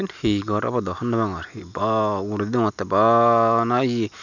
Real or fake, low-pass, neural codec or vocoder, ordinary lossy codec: real; 7.2 kHz; none; none